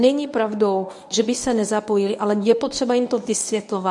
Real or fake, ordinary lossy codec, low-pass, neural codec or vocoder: fake; MP3, 64 kbps; 10.8 kHz; codec, 24 kHz, 0.9 kbps, WavTokenizer, medium speech release version 1